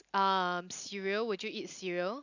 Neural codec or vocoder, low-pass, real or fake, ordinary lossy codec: none; 7.2 kHz; real; none